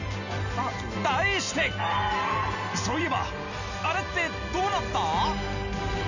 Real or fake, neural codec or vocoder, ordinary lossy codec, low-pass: real; none; none; 7.2 kHz